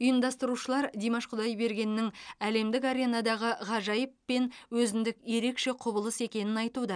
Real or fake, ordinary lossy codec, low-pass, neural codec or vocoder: real; none; 9.9 kHz; none